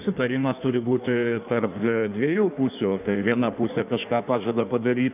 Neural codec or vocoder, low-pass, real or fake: codec, 16 kHz in and 24 kHz out, 1.1 kbps, FireRedTTS-2 codec; 3.6 kHz; fake